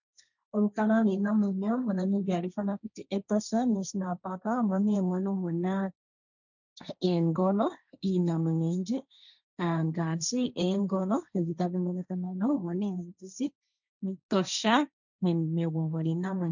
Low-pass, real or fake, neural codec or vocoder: 7.2 kHz; fake; codec, 16 kHz, 1.1 kbps, Voila-Tokenizer